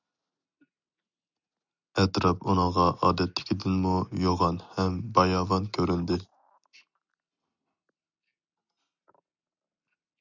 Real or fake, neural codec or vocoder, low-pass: real; none; 7.2 kHz